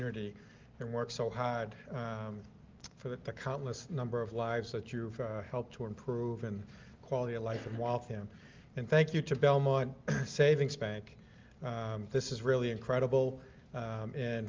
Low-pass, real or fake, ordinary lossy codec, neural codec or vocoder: 7.2 kHz; real; Opus, 32 kbps; none